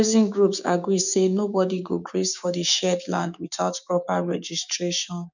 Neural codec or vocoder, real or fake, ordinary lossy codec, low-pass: codec, 16 kHz, 6 kbps, DAC; fake; none; 7.2 kHz